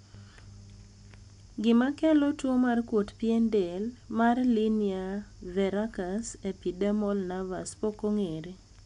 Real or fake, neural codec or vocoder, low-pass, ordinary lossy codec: real; none; 10.8 kHz; none